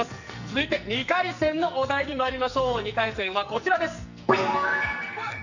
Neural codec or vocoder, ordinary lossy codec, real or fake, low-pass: codec, 44.1 kHz, 2.6 kbps, SNAC; none; fake; 7.2 kHz